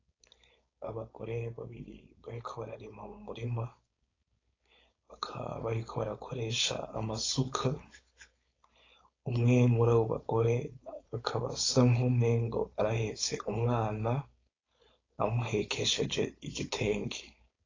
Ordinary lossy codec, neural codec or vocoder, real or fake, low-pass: AAC, 32 kbps; codec, 16 kHz, 4.8 kbps, FACodec; fake; 7.2 kHz